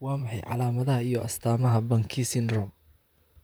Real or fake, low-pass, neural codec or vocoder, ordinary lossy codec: fake; none; vocoder, 44.1 kHz, 128 mel bands, Pupu-Vocoder; none